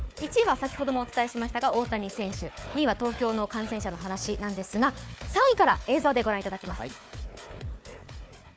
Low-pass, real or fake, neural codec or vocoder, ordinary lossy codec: none; fake; codec, 16 kHz, 4 kbps, FunCodec, trained on Chinese and English, 50 frames a second; none